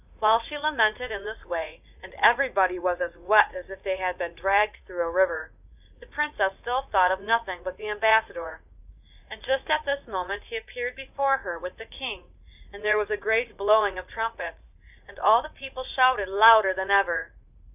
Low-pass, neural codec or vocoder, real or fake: 3.6 kHz; vocoder, 44.1 kHz, 80 mel bands, Vocos; fake